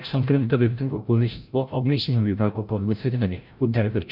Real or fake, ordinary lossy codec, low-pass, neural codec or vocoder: fake; none; 5.4 kHz; codec, 16 kHz, 0.5 kbps, FreqCodec, larger model